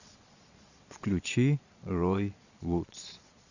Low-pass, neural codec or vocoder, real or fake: 7.2 kHz; vocoder, 22.05 kHz, 80 mel bands, Vocos; fake